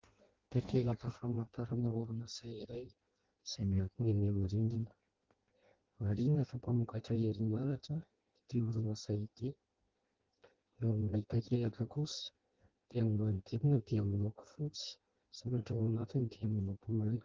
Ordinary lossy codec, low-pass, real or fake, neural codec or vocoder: Opus, 16 kbps; 7.2 kHz; fake; codec, 16 kHz in and 24 kHz out, 0.6 kbps, FireRedTTS-2 codec